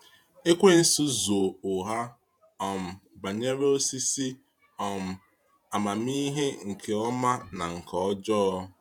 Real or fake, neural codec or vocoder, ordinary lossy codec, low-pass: fake; vocoder, 48 kHz, 128 mel bands, Vocos; none; 19.8 kHz